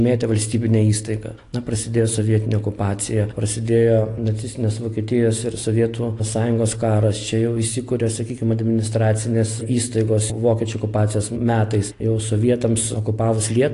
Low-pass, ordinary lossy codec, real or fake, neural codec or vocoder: 10.8 kHz; AAC, 48 kbps; real; none